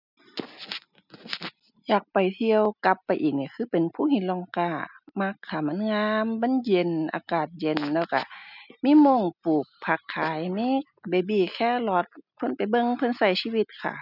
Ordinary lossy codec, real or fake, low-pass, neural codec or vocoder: none; real; 5.4 kHz; none